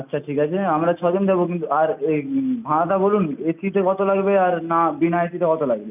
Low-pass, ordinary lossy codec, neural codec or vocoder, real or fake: 3.6 kHz; none; none; real